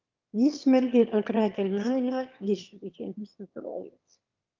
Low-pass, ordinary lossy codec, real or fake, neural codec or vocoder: 7.2 kHz; Opus, 24 kbps; fake; autoencoder, 22.05 kHz, a latent of 192 numbers a frame, VITS, trained on one speaker